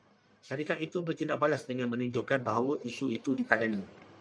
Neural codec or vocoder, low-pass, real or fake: codec, 44.1 kHz, 1.7 kbps, Pupu-Codec; 9.9 kHz; fake